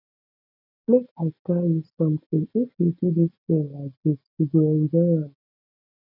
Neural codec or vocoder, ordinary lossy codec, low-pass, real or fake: none; none; 5.4 kHz; real